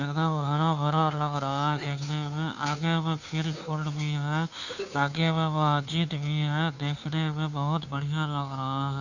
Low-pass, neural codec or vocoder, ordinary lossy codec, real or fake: 7.2 kHz; codec, 16 kHz, 2 kbps, FunCodec, trained on Chinese and English, 25 frames a second; none; fake